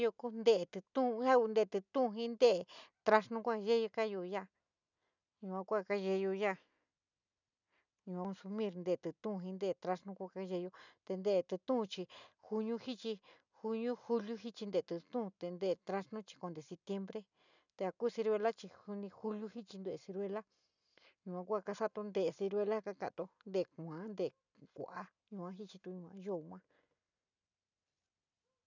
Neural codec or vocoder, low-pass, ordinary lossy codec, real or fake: none; 7.2 kHz; none; real